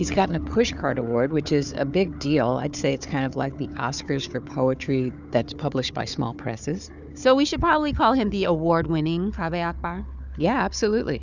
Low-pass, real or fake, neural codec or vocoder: 7.2 kHz; fake; codec, 16 kHz, 4 kbps, FunCodec, trained on Chinese and English, 50 frames a second